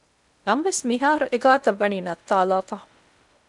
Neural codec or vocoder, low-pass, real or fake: codec, 16 kHz in and 24 kHz out, 0.8 kbps, FocalCodec, streaming, 65536 codes; 10.8 kHz; fake